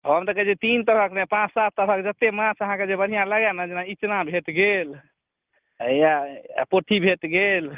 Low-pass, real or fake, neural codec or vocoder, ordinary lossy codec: 3.6 kHz; real; none; Opus, 32 kbps